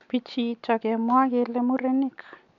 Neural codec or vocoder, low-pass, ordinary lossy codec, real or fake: none; 7.2 kHz; none; real